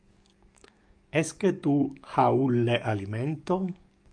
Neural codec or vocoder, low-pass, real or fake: codec, 44.1 kHz, 7.8 kbps, DAC; 9.9 kHz; fake